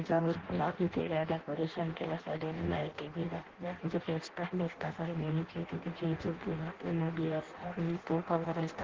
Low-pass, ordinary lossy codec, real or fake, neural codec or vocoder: 7.2 kHz; Opus, 16 kbps; fake; codec, 16 kHz in and 24 kHz out, 0.6 kbps, FireRedTTS-2 codec